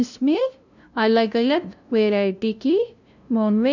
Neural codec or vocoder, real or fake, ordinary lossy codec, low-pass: codec, 16 kHz, 0.5 kbps, FunCodec, trained on LibriTTS, 25 frames a second; fake; none; 7.2 kHz